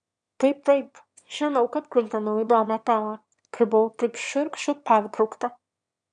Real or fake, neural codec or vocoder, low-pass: fake; autoencoder, 22.05 kHz, a latent of 192 numbers a frame, VITS, trained on one speaker; 9.9 kHz